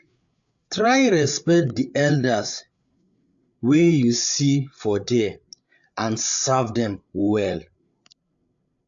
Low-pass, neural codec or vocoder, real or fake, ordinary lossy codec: 7.2 kHz; codec, 16 kHz, 8 kbps, FreqCodec, larger model; fake; AAC, 64 kbps